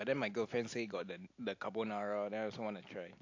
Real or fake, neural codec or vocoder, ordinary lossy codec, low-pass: real; none; AAC, 48 kbps; 7.2 kHz